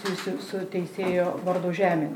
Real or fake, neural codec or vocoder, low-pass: real; none; 19.8 kHz